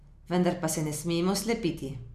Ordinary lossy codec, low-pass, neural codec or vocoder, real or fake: none; 14.4 kHz; none; real